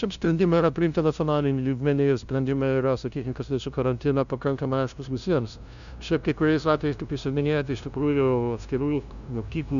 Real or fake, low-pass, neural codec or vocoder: fake; 7.2 kHz; codec, 16 kHz, 0.5 kbps, FunCodec, trained on LibriTTS, 25 frames a second